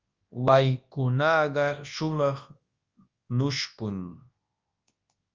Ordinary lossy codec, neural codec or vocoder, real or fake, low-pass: Opus, 32 kbps; codec, 24 kHz, 0.9 kbps, WavTokenizer, large speech release; fake; 7.2 kHz